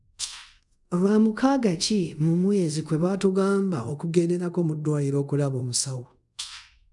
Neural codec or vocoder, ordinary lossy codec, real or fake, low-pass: codec, 24 kHz, 0.5 kbps, DualCodec; none; fake; 10.8 kHz